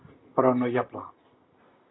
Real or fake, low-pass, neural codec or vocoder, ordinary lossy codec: real; 7.2 kHz; none; AAC, 16 kbps